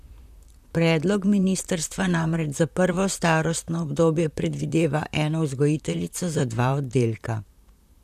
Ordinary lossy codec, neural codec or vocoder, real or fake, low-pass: none; vocoder, 44.1 kHz, 128 mel bands, Pupu-Vocoder; fake; 14.4 kHz